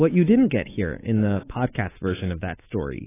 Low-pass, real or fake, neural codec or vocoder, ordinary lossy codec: 3.6 kHz; fake; codec, 24 kHz, 3.1 kbps, DualCodec; AAC, 16 kbps